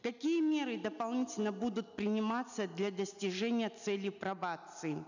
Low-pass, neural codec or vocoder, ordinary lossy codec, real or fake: 7.2 kHz; none; none; real